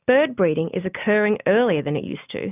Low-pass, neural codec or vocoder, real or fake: 3.6 kHz; none; real